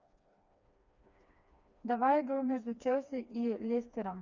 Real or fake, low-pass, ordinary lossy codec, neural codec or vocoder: fake; 7.2 kHz; Opus, 64 kbps; codec, 16 kHz, 2 kbps, FreqCodec, smaller model